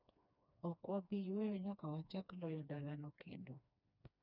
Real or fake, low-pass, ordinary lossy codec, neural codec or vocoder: fake; 5.4 kHz; none; codec, 16 kHz, 2 kbps, FreqCodec, smaller model